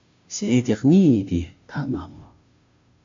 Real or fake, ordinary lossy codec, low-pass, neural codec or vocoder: fake; MP3, 48 kbps; 7.2 kHz; codec, 16 kHz, 0.5 kbps, FunCodec, trained on Chinese and English, 25 frames a second